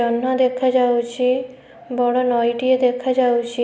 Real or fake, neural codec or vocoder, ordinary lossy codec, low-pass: real; none; none; none